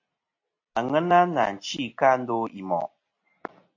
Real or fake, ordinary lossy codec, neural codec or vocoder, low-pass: real; AAC, 48 kbps; none; 7.2 kHz